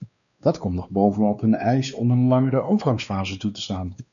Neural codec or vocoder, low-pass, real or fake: codec, 16 kHz, 2 kbps, X-Codec, WavLM features, trained on Multilingual LibriSpeech; 7.2 kHz; fake